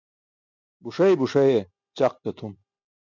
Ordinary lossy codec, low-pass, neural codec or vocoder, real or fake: MP3, 64 kbps; 7.2 kHz; none; real